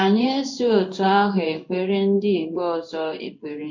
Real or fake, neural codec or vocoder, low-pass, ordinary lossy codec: fake; codec, 16 kHz in and 24 kHz out, 1 kbps, XY-Tokenizer; 7.2 kHz; MP3, 48 kbps